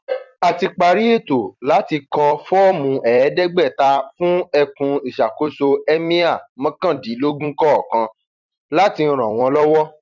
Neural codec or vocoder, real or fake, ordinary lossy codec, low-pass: vocoder, 44.1 kHz, 128 mel bands every 256 samples, BigVGAN v2; fake; none; 7.2 kHz